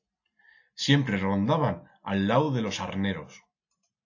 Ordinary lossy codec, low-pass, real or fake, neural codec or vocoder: AAC, 48 kbps; 7.2 kHz; real; none